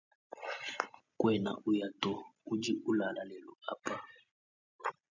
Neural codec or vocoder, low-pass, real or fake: none; 7.2 kHz; real